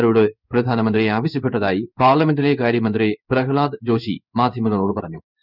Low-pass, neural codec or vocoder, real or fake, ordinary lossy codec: 5.4 kHz; codec, 16 kHz in and 24 kHz out, 1 kbps, XY-Tokenizer; fake; none